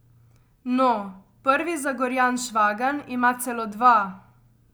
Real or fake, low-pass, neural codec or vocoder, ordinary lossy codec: real; none; none; none